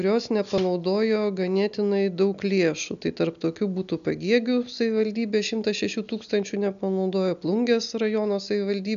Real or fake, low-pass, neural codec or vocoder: real; 7.2 kHz; none